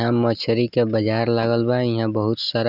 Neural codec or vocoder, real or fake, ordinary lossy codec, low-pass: none; real; none; 5.4 kHz